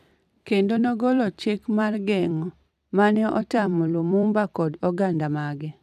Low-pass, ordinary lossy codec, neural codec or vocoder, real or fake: 14.4 kHz; none; vocoder, 44.1 kHz, 128 mel bands every 256 samples, BigVGAN v2; fake